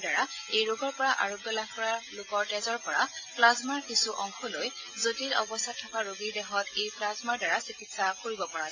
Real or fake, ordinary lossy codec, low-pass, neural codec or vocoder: real; AAC, 32 kbps; 7.2 kHz; none